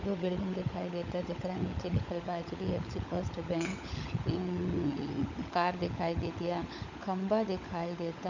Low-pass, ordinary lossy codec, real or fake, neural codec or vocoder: 7.2 kHz; none; fake; codec, 16 kHz, 16 kbps, FunCodec, trained on LibriTTS, 50 frames a second